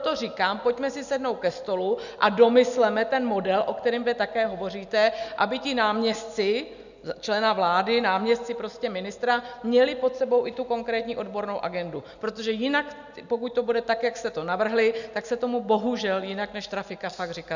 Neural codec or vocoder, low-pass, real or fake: none; 7.2 kHz; real